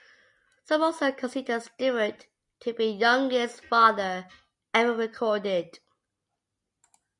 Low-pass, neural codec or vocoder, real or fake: 10.8 kHz; none; real